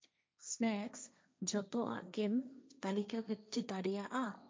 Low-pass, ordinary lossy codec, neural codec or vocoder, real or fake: none; none; codec, 16 kHz, 1.1 kbps, Voila-Tokenizer; fake